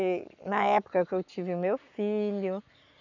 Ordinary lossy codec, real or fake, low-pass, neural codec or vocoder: none; fake; 7.2 kHz; codec, 44.1 kHz, 7.8 kbps, Pupu-Codec